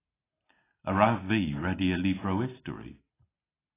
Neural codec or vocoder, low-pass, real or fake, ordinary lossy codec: none; 3.6 kHz; real; AAC, 16 kbps